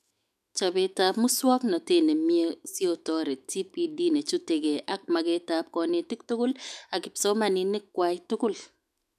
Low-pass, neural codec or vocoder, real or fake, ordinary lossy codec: 14.4 kHz; autoencoder, 48 kHz, 128 numbers a frame, DAC-VAE, trained on Japanese speech; fake; none